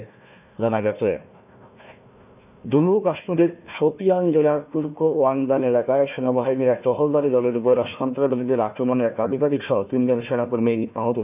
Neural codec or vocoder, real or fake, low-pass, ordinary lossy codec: codec, 16 kHz, 1 kbps, FunCodec, trained on Chinese and English, 50 frames a second; fake; 3.6 kHz; none